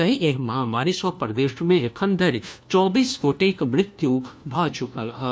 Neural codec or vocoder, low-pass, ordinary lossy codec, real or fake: codec, 16 kHz, 1 kbps, FunCodec, trained on LibriTTS, 50 frames a second; none; none; fake